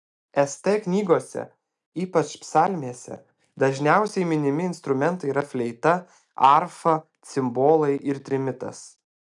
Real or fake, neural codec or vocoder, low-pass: real; none; 10.8 kHz